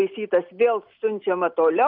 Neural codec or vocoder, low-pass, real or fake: none; 5.4 kHz; real